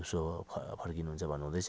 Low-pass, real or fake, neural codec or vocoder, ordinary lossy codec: none; real; none; none